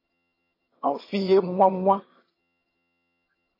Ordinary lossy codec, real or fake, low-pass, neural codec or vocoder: MP3, 24 kbps; fake; 5.4 kHz; vocoder, 22.05 kHz, 80 mel bands, HiFi-GAN